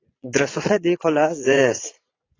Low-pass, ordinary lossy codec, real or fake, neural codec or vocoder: 7.2 kHz; AAC, 32 kbps; fake; vocoder, 22.05 kHz, 80 mel bands, Vocos